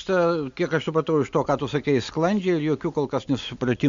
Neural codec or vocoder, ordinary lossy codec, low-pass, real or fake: none; MP3, 64 kbps; 7.2 kHz; real